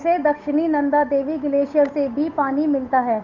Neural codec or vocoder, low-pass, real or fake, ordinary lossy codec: codec, 16 kHz, 8 kbps, FunCodec, trained on Chinese and English, 25 frames a second; 7.2 kHz; fake; none